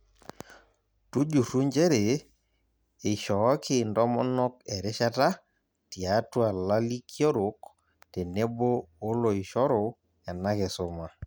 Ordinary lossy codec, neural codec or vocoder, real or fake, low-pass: none; none; real; none